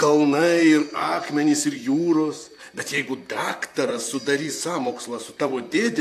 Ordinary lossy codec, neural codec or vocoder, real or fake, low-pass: AAC, 64 kbps; vocoder, 44.1 kHz, 128 mel bands, Pupu-Vocoder; fake; 14.4 kHz